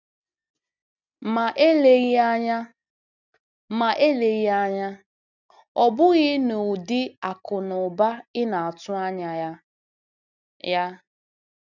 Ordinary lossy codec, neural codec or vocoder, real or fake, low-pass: none; none; real; 7.2 kHz